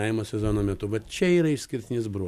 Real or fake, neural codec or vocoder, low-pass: real; none; 14.4 kHz